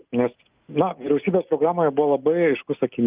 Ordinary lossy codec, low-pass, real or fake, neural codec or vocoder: Opus, 24 kbps; 3.6 kHz; real; none